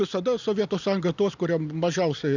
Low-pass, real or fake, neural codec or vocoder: 7.2 kHz; real; none